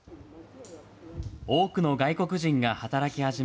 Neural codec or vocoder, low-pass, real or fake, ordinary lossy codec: none; none; real; none